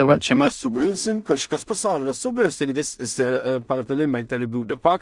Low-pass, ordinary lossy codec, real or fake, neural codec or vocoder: 10.8 kHz; Opus, 64 kbps; fake; codec, 16 kHz in and 24 kHz out, 0.4 kbps, LongCat-Audio-Codec, two codebook decoder